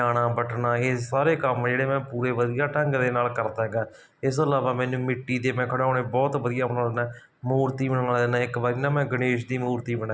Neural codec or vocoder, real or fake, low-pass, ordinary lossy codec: none; real; none; none